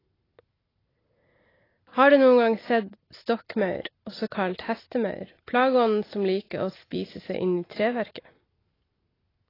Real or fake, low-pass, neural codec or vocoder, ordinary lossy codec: real; 5.4 kHz; none; AAC, 24 kbps